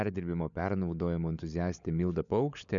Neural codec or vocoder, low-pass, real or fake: codec, 16 kHz, 16 kbps, FunCodec, trained on LibriTTS, 50 frames a second; 7.2 kHz; fake